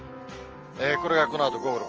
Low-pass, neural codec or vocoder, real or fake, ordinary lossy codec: 7.2 kHz; none; real; Opus, 24 kbps